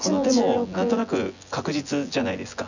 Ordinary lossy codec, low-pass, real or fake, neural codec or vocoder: none; 7.2 kHz; fake; vocoder, 24 kHz, 100 mel bands, Vocos